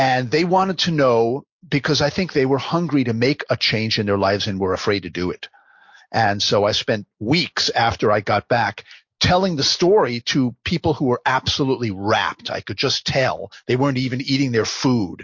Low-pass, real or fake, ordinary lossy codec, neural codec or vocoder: 7.2 kHz; real; MP3, 48 kbps; none